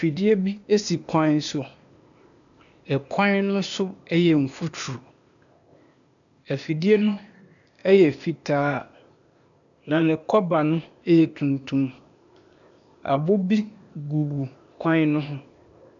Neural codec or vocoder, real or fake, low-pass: codec, 16 kHz, 0.8 kbps, ZipCodec; fake; 7.2 kHz